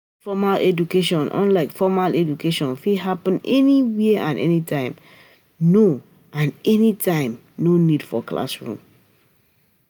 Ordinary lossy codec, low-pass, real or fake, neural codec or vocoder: none; none; real; none